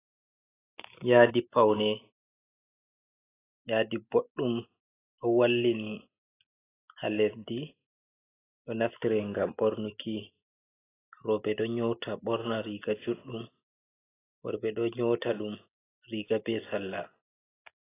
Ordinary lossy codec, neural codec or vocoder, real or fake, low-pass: AAC, 16 kbps; none; real; 3.6 kHz